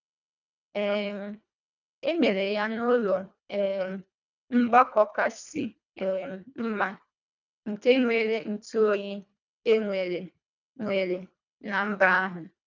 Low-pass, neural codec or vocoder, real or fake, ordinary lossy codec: 7.2 kHz; codec, 24 kHz, 1.5 kbps, HILCodec; fake; none